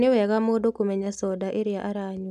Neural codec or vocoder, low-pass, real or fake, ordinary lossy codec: none; 14.4 kHz; real; none